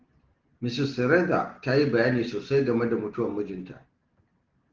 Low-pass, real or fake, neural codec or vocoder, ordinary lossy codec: 7.2 kHz; real; none; Opus, 16 kbps